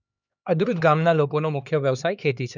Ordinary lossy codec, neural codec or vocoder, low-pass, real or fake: none; codec, 16 kHz, 2 kbps, X-Codec, HuBERT features, trained on LibriSpeech; 7.2 kHz; fake